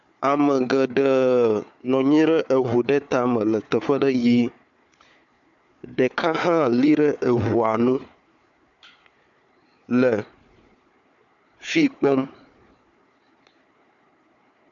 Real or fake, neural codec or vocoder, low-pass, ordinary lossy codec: fake; codec, 16 kHz, 4 kbps, FunCodec, trained on Chinese and English, 50 frames a second; 7.2 kHz; MP3, 64 kbps